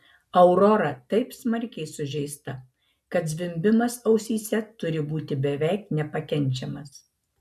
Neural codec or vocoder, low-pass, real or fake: none; 14.4 kHz; real